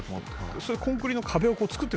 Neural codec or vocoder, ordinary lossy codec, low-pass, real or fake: none; none; none; real